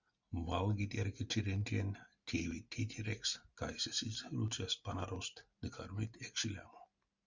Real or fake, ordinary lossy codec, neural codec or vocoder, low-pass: real; Opus, 64 kbps; none; 7.2 kHz